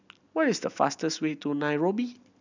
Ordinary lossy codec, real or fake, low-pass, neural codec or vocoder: none; real; 7.2 kHz; none